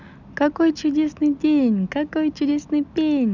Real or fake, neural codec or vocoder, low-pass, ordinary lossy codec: real; none; 7.2 kHz; none